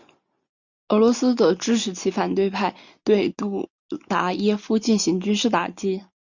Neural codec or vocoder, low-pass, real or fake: none; 7.2 kHz; real